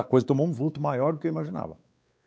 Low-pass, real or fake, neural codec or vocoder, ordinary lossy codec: none; fake; codec, 16 kHz, 4 kbps, X-Codec, WavLM features, trained on Multilingual LibriSpeech; none